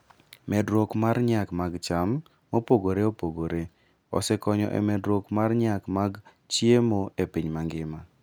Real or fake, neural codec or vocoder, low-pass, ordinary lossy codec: real; none; none; none